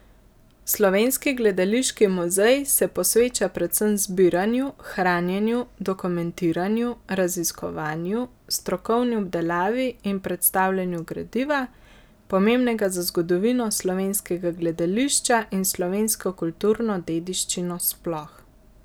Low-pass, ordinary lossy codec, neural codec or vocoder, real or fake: none; none; none; real